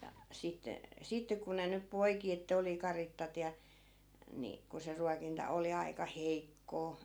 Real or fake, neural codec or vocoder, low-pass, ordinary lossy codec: real; none; none; none